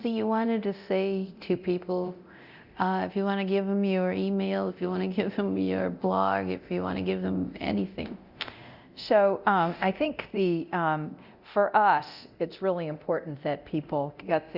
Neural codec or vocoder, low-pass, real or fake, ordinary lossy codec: codec, 24 kHz, 0.9 kbps, DualCodec; 5.4 kHz; fake; Opus, 64 kbps